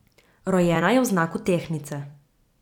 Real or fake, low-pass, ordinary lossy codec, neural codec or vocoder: fake; 19.8 kHz; none; vocoder, 44.1 kHz, 128 mel bands every 256 samples, BigVGAN v2